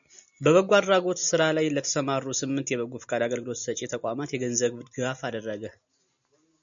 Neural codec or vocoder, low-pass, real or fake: none; 7.2 kHz; real